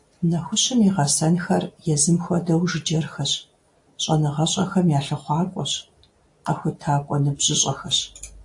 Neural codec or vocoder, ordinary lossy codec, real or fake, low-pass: none; AAC, 64 kbps; real; 10.8 kHz